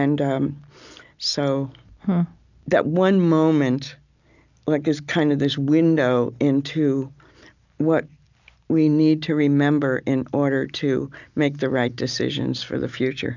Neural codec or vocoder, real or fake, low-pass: none; real; 7.2 kHz